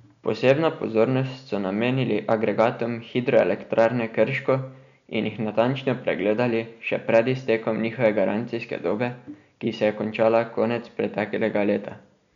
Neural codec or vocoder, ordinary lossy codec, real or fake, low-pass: none; none; real; 7.2 kHz